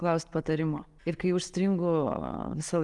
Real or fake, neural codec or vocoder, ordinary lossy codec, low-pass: real; none; Opus, 24 kbps; 10.8 kHz